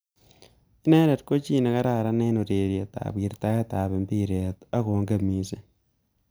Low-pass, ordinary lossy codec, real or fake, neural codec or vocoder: none; none; real; none